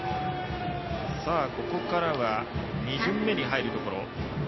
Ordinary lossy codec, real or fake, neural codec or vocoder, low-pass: MP3, 24 kbps; real; none; 7.2 kHz